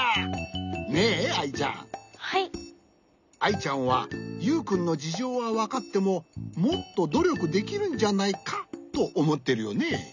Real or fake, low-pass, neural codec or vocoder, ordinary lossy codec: real; 7.2 kHz; none; none